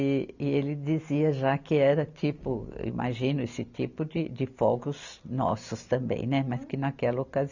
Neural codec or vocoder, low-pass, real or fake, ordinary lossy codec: none; 7.2 kHz; real; none